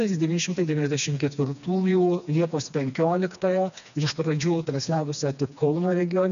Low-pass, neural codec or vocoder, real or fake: 7.2 kHz; codec, 16 kHz, 2 kbps, FreqCodec, smaller model; fake